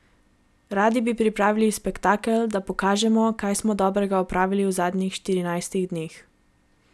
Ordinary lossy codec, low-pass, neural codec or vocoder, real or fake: none; none; none; real